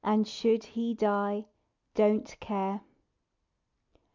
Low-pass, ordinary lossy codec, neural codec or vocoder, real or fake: 7.2 kHz; MP3, 64 kbps; none; real